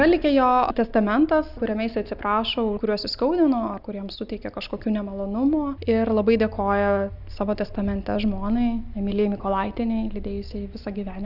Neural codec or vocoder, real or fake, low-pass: none; real; 5.4 kHz